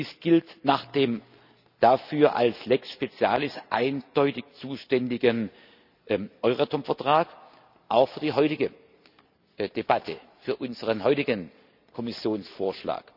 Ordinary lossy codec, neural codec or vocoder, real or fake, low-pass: none; none; real; 5.4 kHz